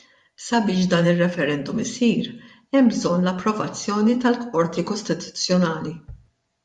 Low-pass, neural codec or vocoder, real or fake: 10.8 kHz; vocoder, 24 kHz, 100 mel bands, Vocos; fake